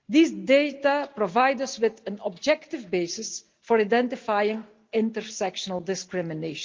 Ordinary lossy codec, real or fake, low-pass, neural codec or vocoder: Opus, 32 kbps; real; 7.2 kHz; none